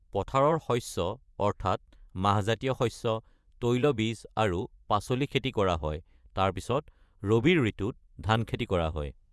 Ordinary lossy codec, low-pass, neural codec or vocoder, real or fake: none; none; vocoder, 24 kHz, 100 mel bands, Vocos; fake